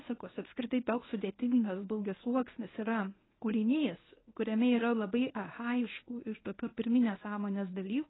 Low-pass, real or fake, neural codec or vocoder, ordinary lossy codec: 7.2 kHz; fake; codec, 24 kHz, 0.9 kbps, WavTokenizer, medium speech release version 1; AAC, 16 kbps